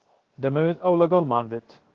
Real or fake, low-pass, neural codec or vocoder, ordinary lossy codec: fake; 7.2 kHz; codec, 16 kHz, 0.7 kbps, FocalCodec; Opus, 16 kbps